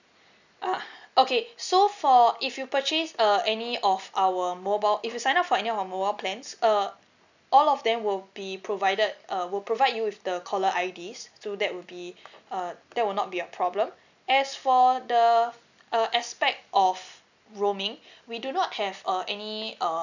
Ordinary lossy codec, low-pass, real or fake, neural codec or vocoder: none; 7.2 kHz; real; none